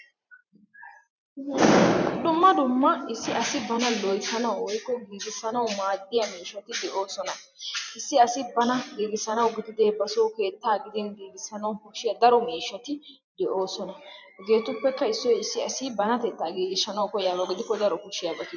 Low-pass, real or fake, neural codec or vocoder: 7.2 kHz; fake; vocoder, 44.1 kHz, 128 mel bands every 512 samples, BigVGAN v2